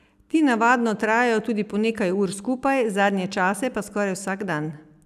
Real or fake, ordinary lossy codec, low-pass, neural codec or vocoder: real; none; 14.4 kHz; none